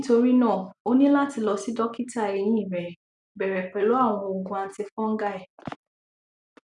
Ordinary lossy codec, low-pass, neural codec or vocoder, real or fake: none; 10.8 kHz; none; real